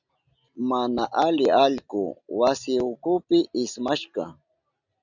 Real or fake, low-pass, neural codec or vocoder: real; 7.2 kHz; none